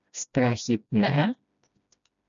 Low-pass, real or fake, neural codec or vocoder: 7.2 kHz; fake; codec, 16 kHz, 1 kbps, FreqCodec, smaller model